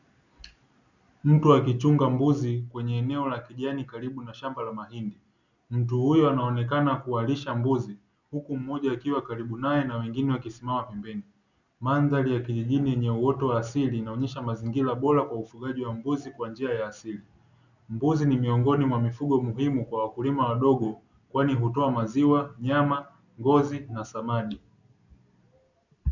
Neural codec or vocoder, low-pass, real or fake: none; 7.2 kHz; real